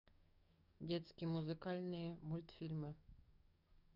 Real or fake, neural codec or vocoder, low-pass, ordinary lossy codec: fake; codec, 44.1 kHz, 7.8 kbps, DAC; 5.4 kHz; none